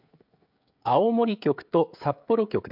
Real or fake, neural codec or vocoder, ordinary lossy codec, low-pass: fake; codec, 16 kHz, 16 kbps, FreqCodec, smaller model; MP3, 48 kbps; 5.4 kHz